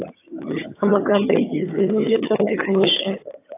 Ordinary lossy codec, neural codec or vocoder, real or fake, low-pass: AAC, 24 kbps; vocoder, 22.05 kHz, 80 mel bands, HiFi-GAN; fake; 3.6 kHz